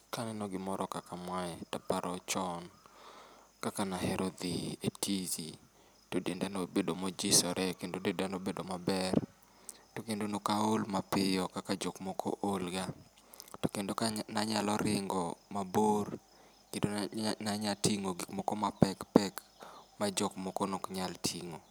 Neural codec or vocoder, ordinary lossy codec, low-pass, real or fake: none; none; none; real